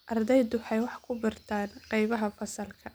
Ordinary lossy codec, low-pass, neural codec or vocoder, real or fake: none; none; none; real